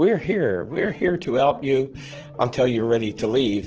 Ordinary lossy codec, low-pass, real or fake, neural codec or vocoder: Opus, 16 kbps; 7.2 kHz; fake; vocoder, 44.1 kHz, 80 mel bands, Vocos